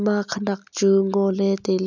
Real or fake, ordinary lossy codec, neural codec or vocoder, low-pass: real; none; none; 7.2 kHz